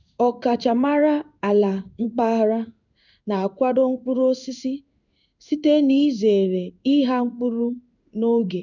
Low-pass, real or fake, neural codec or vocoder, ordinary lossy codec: 7.2 kHz; fake; codec, 16 kHz in and 24 kHz out, 1 kbps, XY-Tokenizer; none